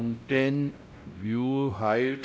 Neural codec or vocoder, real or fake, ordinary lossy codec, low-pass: codec, 16 kHz, 0.5 kbps, X-Codec, WavLM features, trained on Multilingual LibriSpeech; fake; none; none